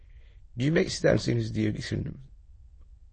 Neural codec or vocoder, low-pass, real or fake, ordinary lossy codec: autoencoder, 22.05 kHz, a latent of 192 numbers a frame, VITS, trained on many speakers; 9.9 kHz; fake; MP3, 32 kbps